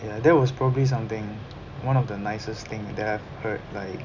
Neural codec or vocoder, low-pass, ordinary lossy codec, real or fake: none; 7.2 kHz; none; real